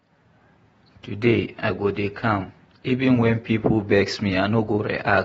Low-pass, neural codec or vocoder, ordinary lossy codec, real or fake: 19.8 kHz; vocoder, 48 kHz, 128 mel bands, Vocos; AAC, 24 kbps; fake